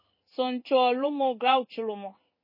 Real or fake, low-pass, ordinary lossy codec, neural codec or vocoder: fake; 5.4 kHz; MP3, 24 kbps; codec, 16 kHz in and 24 kHz out, 1 kbps, XY-Tokenizer